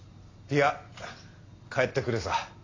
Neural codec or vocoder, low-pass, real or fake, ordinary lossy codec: none; 7.2 kHz; real; AAC, 32 kbps